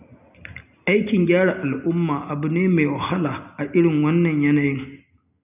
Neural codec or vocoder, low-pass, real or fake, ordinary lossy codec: none; 3.6 kHz; real; none